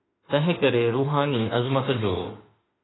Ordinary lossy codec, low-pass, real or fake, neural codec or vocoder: AAC, 16 kbps; 7.2 kHz; fake; autoencoder, 48 kHz, 32 numbers a frame, DAC-VAE, trained on Japanese speech